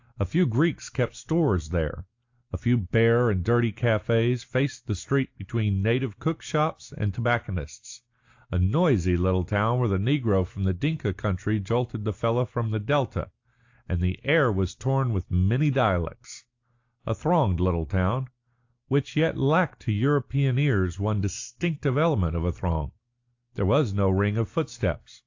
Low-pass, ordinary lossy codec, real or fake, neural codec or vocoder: 7.2 kHz; AAC, 48 kbps; real; none